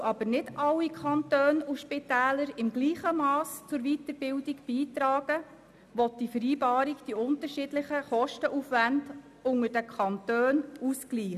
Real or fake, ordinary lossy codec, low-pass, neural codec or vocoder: real; none; 14.4 kHz; none